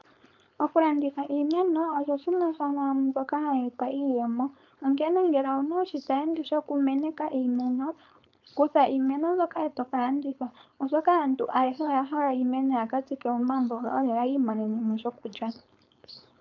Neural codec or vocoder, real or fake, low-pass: codec, 16 kHz, 4.8 kbps, FACodec; fake; 7.2 kHz